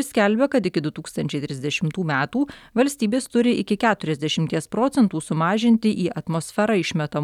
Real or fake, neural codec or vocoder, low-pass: real; none; 19.8 kHz